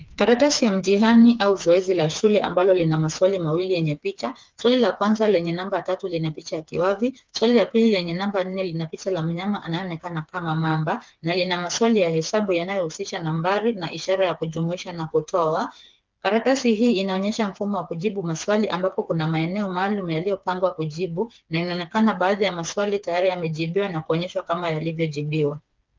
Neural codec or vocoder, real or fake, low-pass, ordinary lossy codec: codec, 16 kHz, 4 kbps, FreqCodec, smaller model; fake; 7.2 kHz; Opus, 24 kbps